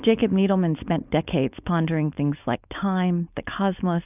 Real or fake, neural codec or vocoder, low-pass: fake; codec, 16 kHz, 4.8 kbps, FACodec; 3.6 kHz